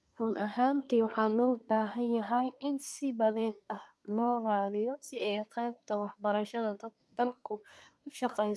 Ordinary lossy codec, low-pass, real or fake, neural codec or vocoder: none; none; fake; codec, 24 kHz, 1 kbps, SNAC